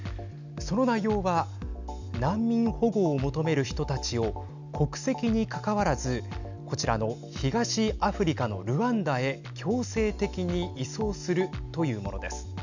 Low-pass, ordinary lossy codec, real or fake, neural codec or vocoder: 7.2 kHz; none; real; none